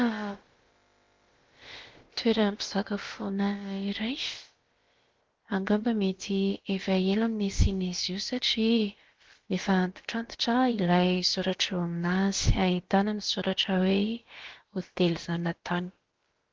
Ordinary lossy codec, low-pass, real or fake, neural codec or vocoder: Opus, 16 kbps; 7.2 kHz; fake; codec, 16 kHz, about 1 kbps, DyCAST, with the encoder's durations